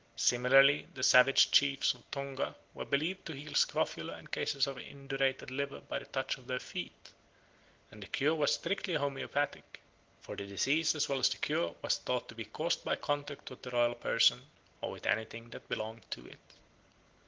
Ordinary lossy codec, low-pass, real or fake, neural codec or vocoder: Opus, 16 kbps; 7.2 kHz; real; none